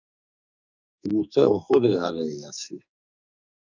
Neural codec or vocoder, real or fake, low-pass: codec, 44.1 kHz, 2.6 kbps, SNAC; fake; 7.2 kHz